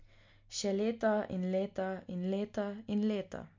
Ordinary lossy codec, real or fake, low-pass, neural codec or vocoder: MP3, 48 kbps; real; 7.2 kHz; none